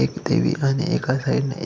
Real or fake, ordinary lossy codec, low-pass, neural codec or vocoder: real; none; none; none